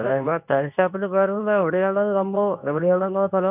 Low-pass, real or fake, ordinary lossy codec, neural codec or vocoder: 3.6 kHz; fake; none; codec, 24 kHz, 0.9 kbps, WavTokenizer, medium speech release version 2